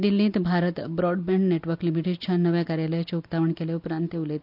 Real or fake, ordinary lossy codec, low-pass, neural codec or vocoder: real; none; 5.4 kHz; none